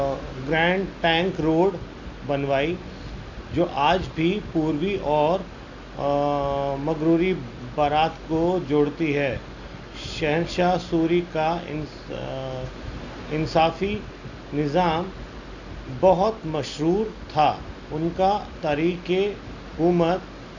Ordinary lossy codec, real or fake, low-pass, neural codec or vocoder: Opus, 64 kbps; real; 7.2 kHz; none